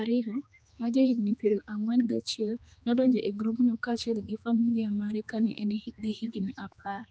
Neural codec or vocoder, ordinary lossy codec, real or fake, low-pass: codec, 16 kHz, 2 kbps, X-Codec, HuBERT features, trained on general audio; none; fake; none